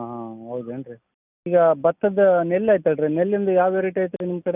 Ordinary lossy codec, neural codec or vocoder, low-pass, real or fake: none; none; 3.6 kHz; real